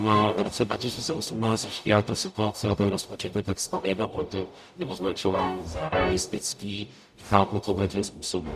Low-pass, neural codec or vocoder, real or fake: 14.4 kHz; codec, 44.1 kHz, 0.9 kbps, DAC; fake